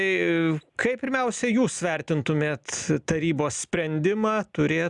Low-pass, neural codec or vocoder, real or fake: 10.8 kHz; none; real